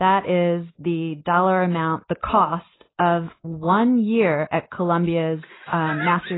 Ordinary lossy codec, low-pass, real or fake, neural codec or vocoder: AAC, 16 kbps; 7.2 kHz; real; none